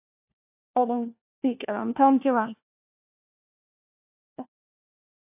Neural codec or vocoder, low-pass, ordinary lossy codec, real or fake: codec, 16 kHz, 1 kbps, FunCodec, trained on LibriTTS, 50 frames a second; 3.6 kHz; AAC, 32 kbps; fake